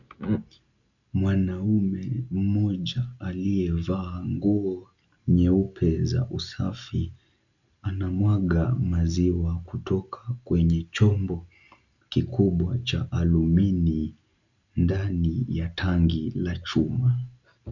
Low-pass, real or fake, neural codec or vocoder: 7.2 kHz; real; none